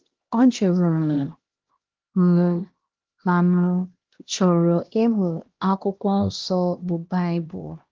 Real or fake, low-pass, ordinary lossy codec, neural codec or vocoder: fake; 7.2 kHz; Opus, 16 kbps; codec, 16 kHz, 1 kbps, X-Codec, HuBERT features, trained on LibriSpeech